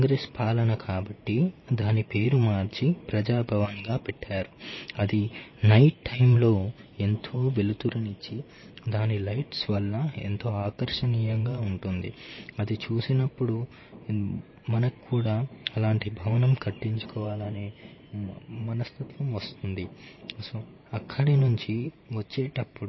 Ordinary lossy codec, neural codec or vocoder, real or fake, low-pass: MP3, 24 kbps; vocoder, 44.1 kHz, 128 mel bands every 512 samples, BigVGAN v2; fake; 7.2 kHz